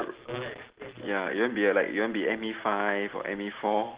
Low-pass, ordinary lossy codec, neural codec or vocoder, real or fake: 3.6 kHz; Opus, 16 kbps; none; real